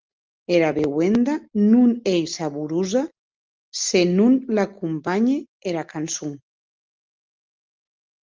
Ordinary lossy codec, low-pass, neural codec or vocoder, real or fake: Opus, 24 kbps; 7.2 kHz; none; real